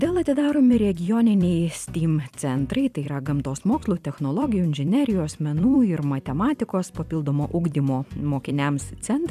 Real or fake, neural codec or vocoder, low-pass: fake; vocoder, 44.1 kHz, 128 mel bands every 256 samples, BigVGAN v2; 14.4 kHz